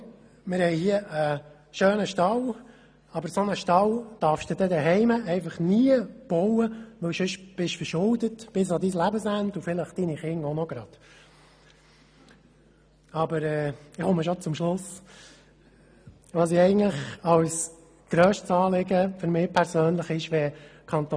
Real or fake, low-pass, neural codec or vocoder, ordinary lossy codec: real; none; none; none